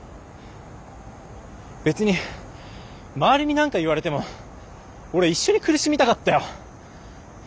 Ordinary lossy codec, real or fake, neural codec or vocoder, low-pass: none; real; none; none